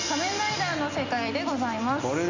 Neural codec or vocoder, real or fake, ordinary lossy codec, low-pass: none; real; MP3, 64 kbps; 7.2 kHz